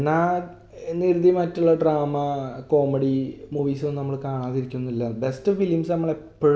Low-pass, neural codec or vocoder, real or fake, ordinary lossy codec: none; none; real; none